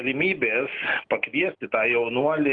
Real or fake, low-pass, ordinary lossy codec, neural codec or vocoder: real; 9.9 kHz; Opus, 24 kbps; none